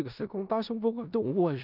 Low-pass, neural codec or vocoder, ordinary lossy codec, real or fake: 5.4 kHz; codec, 16 kHz in and 24 kHz out, 0.4 kbps, LongCat-Audio-Codec, four codebook decoder; none; fake